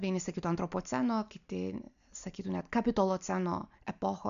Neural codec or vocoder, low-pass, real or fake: none; 7.2 kHz; real